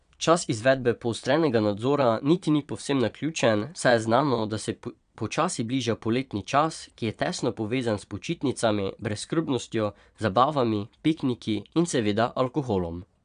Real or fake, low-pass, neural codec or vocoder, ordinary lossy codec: fake; 9.9 kHz; vocoder, 22.05 kHz, 80 mel bands, Vocos; none